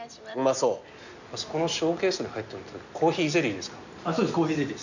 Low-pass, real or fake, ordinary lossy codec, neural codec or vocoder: 7.2 kHz; real; none; none